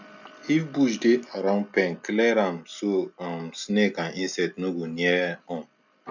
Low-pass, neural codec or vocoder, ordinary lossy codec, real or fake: 7.2 kHz; none; none; real